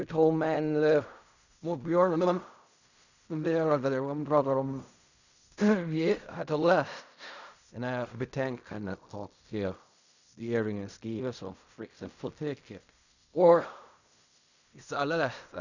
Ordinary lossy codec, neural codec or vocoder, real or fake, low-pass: none; codec, 16 kHz in and 24 kHz out, 0.4 kbps, LongCat-Audio-Codec, fine tuned four codebook decoder; fake; 7.2 kHz